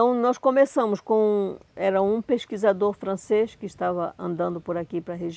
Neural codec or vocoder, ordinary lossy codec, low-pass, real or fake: none; none; none; real